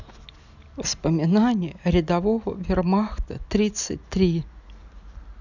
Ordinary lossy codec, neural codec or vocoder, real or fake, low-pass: none; none; real; 7.2 kHz